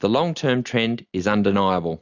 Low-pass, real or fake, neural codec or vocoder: 7.2 kHz; real; none